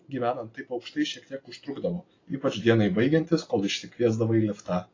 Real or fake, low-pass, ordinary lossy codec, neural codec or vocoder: real; 7.2 kHz; AAC, 32 kbps; none